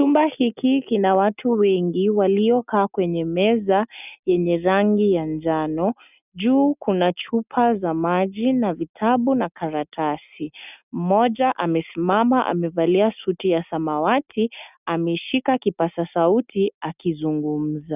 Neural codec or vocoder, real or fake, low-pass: none; real; 3.6 kHz